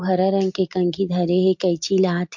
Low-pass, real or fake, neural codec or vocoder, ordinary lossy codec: 7.2 kHz; real; none; MP3, 48 kbps